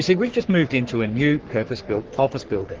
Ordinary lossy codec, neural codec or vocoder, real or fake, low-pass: Opus, 16 kbps; codec, 44.1 kHz, 3.4 kbps, Pupu-Codec; fake; 7.2 kHz